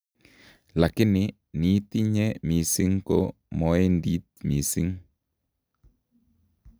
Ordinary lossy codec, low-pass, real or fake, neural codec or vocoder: none; none; real; none